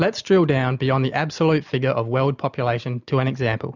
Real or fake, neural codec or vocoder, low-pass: real; none; 7.2 kHz